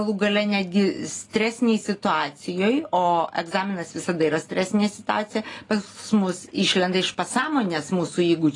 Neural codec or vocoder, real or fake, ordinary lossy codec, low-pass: none; real; AAC, 32 kbps; 10.8 kHz